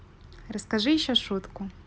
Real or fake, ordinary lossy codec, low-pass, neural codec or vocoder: real; none; none; none